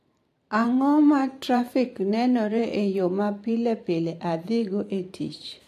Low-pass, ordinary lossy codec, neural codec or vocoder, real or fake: 14.4 kHz; AAC, 64 kbps; vocoder, 44.1 kHz, 128 mel bands every 512 samples, BigVGAN v2; fake